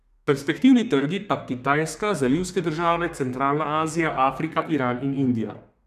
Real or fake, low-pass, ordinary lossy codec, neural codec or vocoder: fake; 14.4 kHz; none; codec, 32 kHz, 1.9 kbps, SNAC